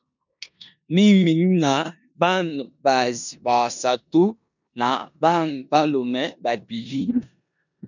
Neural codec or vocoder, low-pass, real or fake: codec, 16 kHz in and 24 kHz out, 0.9 kbps, LongCat-Audio-Codec, four codebook decoder; 7.2 kHz; fake